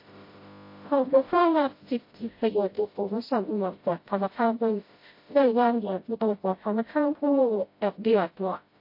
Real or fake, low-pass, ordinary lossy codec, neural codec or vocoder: fake; 5.4 kHz; MP3, 32 kbps; codec, 16 kHz, 0.5 kbps, FreqCodec, smaller model